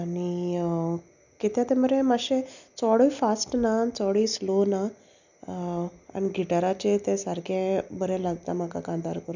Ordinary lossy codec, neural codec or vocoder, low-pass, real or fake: none; none; 7.2 kHz; real